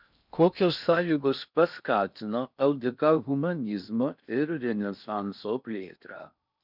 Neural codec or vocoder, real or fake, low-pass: codec, 16 kHz in and 24 kHz out, 0.6 kbps, FocalCodec, streaming, 2048 codes; fake; 5.4 kHz